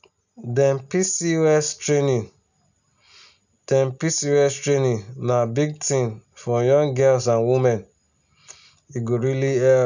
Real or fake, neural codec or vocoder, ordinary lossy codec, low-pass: real; none; none; 7.2 kHz